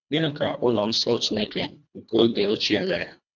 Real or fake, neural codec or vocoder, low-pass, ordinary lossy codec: fake; codec, 24 kHz, 1.5 kbps, HILCodec; 7.2 kHz; none